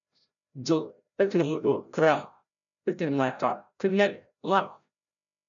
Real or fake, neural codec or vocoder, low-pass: fake; codec, 16 kHz, 0.5 kbps, FreqCodec, larger model; 7.2 kHz